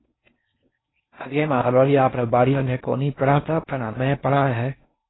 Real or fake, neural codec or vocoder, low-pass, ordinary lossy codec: fake; codec, 16 kHz in and 24 kHz out, 0.6 kbps, FocalCodec, streaming, 4096 codes; 7.2 kHz; AAC, 16 kbps